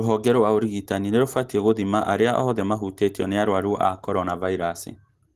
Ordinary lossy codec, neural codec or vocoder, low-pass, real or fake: Opus, 16 kbps; none; 14.4 kHz; real